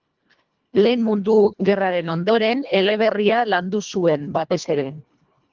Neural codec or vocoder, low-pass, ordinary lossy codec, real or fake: codec, 24 kHz, 1.5 kbps, HILCodec; 7.2 kHz; Opus, 32 kbps; fake